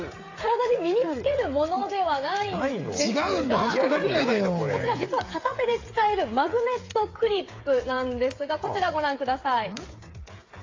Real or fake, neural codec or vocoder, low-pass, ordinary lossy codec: fake; codec, 16 kHz, 8 kbps, FreqCodec, smaller model; 7.2 kHz; AAC, 32 kbps